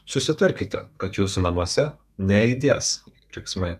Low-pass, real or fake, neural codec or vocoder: 14.4 kHz; fake; codec, 32 kHz, 1.9 kbps, SNAC